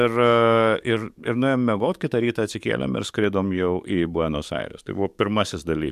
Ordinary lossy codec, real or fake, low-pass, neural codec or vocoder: MP3, 96 kbps; fake; 14.4 kHz; codec, 44.1 kHz, 7.8 kbps, DAC